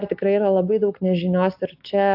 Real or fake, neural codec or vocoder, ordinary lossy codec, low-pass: real; none; AAC, 48 kbps; 5.4 kHz